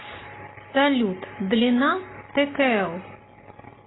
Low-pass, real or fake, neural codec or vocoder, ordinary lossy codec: 7.2 kHz; real; none; AAC, 16 kbps